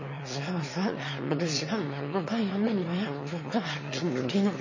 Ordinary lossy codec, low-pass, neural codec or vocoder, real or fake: MP3, 32 kbps; 7.2 kHz; autoencoder, 22.05 kHz, a latent of 192 numbers a frame, VITS, trained on one speaker; fake